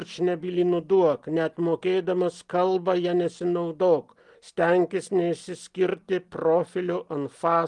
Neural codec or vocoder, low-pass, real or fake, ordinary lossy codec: none; 10.8 kHz; real; Opus, 24 kbps